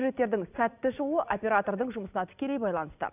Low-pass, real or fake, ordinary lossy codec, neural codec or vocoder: 3.6 kHz; real; none; none